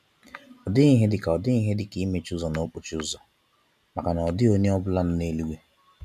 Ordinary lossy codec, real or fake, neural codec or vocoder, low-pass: none; real; none; 14.4 kHz